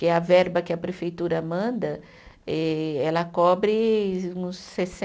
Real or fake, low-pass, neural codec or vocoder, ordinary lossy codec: real; none; none; none